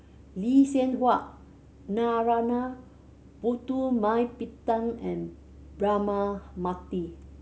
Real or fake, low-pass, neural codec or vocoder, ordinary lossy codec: real; none; none; none